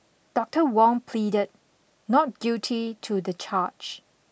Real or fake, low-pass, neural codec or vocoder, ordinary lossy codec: real; none; none; none